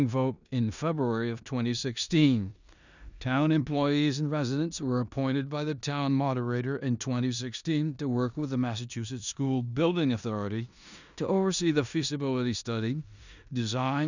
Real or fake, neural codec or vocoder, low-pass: fake; codec, 16 kHz in and 24 kHz out, 0.9 kbps, LongCat-Audio-Codec, four codebook decoder; 7.2 kHz